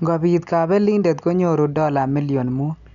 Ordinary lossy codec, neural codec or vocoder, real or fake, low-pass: none; none; real; 7.2 kHz